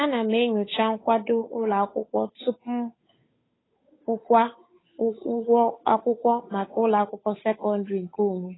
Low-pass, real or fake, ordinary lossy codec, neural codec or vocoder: 7.2 kHz; fake; AAC, 16 kbps; codec, 16 kHz, 8 kbps, FunCodec, trained on Chinese and English, 25 frames a second